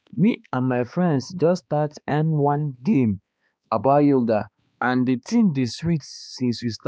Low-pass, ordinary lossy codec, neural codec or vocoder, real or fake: none; none; codec, 16 kHz, 2 kbps, X-Codec, HuBERT features, trained on balanced general audio; fake